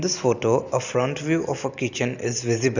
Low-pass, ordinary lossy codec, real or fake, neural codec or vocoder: 7.2 kHz; none; real; none